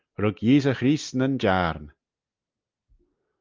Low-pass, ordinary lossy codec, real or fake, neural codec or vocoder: 7.2 kHz; Opus, 24 kbps; real; none